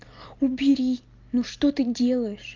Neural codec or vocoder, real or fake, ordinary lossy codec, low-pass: none; real; Opus, 24 kbps; 7.2 kHz